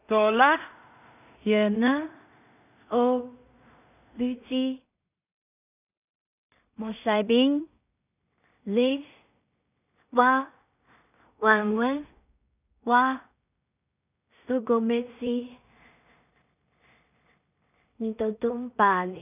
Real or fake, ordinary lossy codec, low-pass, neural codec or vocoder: fake; none; 3.6 kHz; codec, 16 kHz in and 24 kHz out, 0.4 kbps, LongCat-Audio-Codec, two codebook decoder